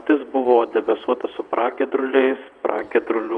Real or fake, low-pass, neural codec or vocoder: fake; 9.9 kHz; vocoder, 22.05 kHz, 80 mel bands, WaveNeXt